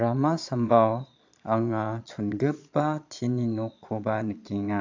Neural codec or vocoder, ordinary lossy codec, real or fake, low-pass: vocoder, 22.05 kHz, 80 mel bands, Vocos; AAC, 48 kbps; fake; 7.2 kHz